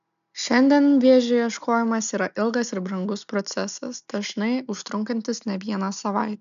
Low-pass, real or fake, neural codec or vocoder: 7.2 kHz; real; none